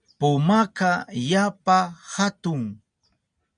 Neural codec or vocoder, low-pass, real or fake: none; 9.9 kHz; real